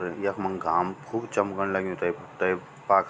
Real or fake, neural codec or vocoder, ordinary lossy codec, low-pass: real; none; none; none